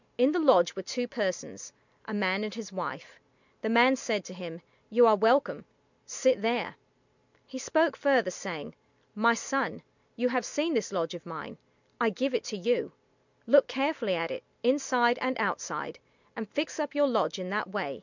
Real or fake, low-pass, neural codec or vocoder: real; 7.2 kHz; none